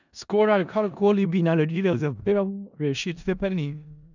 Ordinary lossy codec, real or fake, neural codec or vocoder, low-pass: none; fake; codec, 16 kHz in and 24 kHz out, 0.4 kbps, LongCat-Audio-Codec, four codebook decoder; 7.2 kHz